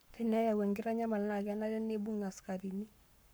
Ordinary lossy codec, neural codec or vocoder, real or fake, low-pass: none; codec, 44.1 kHz, 7.8 kbps, Pupu-Codec; fake; none